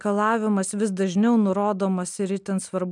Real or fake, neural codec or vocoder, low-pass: real; none; 10.8 kHz